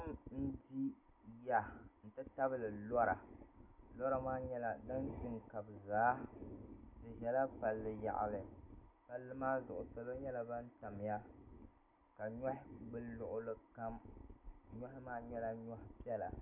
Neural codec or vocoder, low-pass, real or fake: none; 3.6 kHz; real